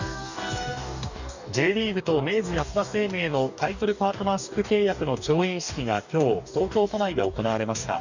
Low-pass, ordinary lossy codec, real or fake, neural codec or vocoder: 7.2 kHz; none; fake; codec, 44.1 kHz, 2.6 kbps, DAC